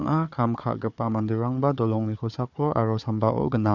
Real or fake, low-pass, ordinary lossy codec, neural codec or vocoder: fake; 7.2 kHz; none; codec, 16 kHz, 4 kbps, FunCodec, trained on Chinese and English, 50 frames a second